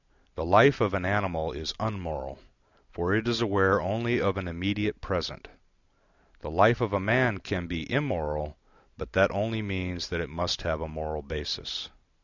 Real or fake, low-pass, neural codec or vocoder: real; 7.2 kHz; none